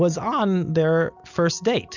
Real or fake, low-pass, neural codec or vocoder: real; 7.2 kHz; none